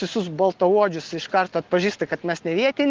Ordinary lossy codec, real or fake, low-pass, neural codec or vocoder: Opus, 16 kbps; real; 7.2 kHz; none